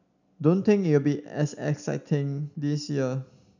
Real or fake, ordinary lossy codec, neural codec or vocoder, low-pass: real; none; none; 7.2 kHz